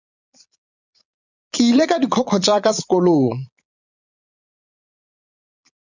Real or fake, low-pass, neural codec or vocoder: fake; 7.2 kHz; vocoder, 22.05 kHz, 80 mel bands, Vocos